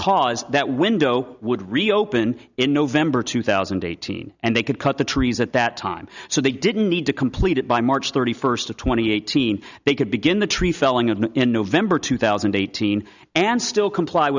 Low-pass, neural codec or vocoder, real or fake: 7.2 kHz; none; real